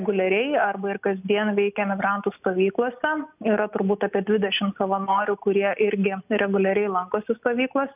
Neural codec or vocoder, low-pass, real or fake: none; 3.6 kHz; real